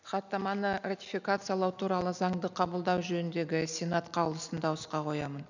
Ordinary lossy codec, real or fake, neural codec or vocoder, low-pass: none; real; none; 7.2 kHz